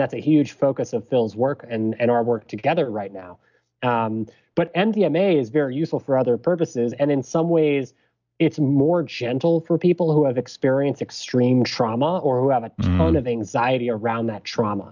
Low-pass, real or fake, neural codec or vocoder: 7.2 kHz; real; none